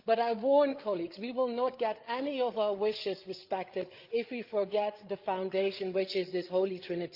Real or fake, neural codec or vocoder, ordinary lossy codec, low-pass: fake; codec, 16 kHz, 8 kbps, FreqCodec, larger model; Opus, 32 kbps; 5.4 kHz